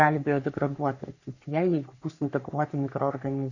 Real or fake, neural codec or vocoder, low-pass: fake; codec, 44.1 kHz, 3.4 kbps, Pupu-Codec; 7.2 kHz